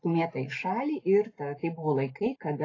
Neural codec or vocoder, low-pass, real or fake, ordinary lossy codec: none; 7.2 kHz; real; AAC, 32 kbps